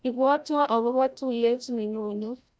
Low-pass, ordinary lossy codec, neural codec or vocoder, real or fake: none; none; codec, 16 kHz, 0.5 kbps, FreqCodec, larger model; fake